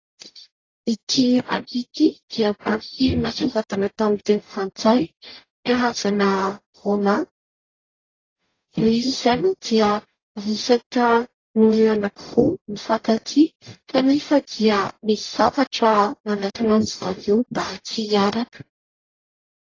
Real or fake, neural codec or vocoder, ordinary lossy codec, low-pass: fake; codec, 44.1 kHz, 0.9 kbps, DAC; AAC, 32 kbps; 7.2 kHz